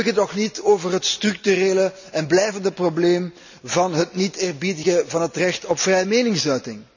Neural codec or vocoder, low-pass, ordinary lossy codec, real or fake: none; 7.2 kHz; none; real